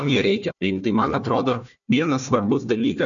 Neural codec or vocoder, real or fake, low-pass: codec, 16 kHz, 1 kbps, FunCodec, trained on Chinese and English, 50 frames a second; fake; 7.2 kHz